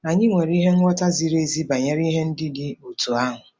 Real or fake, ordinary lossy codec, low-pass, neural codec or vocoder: real; none; none; none